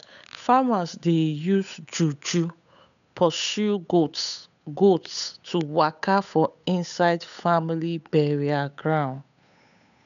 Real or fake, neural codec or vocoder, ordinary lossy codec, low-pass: fake; codec, 16 kHz, 8 kbps, FunCodec, trained on Chinese and English, 25 frames a second; none; 7.2 kHz